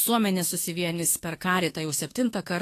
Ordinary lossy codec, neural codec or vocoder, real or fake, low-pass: AAC, 48 kbps; autoencoder, 48 kHz, 32 numbers a frame, DAC-VAE, trained on Japanese speech; fake; 14.4 kHz